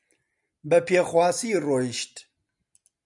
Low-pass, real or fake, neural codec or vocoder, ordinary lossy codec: 10.8 kHz; real; none; MP3, 96 kbps